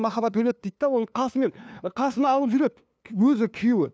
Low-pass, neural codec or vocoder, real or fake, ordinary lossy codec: none; codec, 16 kHz, 2 kbps, FunCodec, trained on LibriTTS, 25 frames a second; fake; none